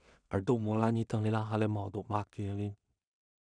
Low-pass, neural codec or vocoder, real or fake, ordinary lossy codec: 9.9 kHz; codec, 16 kHz in and 24 kHz out, 0.4 kbps, LongCat-Audio-Codec, two codebook decoder; fake; none